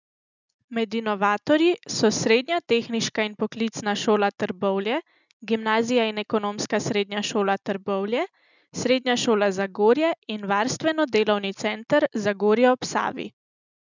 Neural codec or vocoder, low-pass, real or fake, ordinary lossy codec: none; 7.2 kHz; real; none